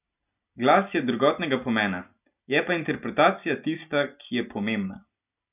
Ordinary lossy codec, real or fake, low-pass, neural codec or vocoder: none; real; 3.6 kHz; none